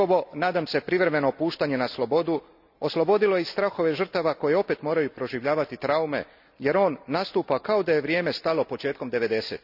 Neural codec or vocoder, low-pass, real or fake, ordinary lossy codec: none; 5.4 kHz; real; none